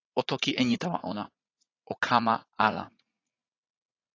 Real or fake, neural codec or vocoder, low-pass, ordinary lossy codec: fake; vocoder, 44.1 kHz, 80 mel bands, Vocos; 7.2 kHz; AAC, 32 kbps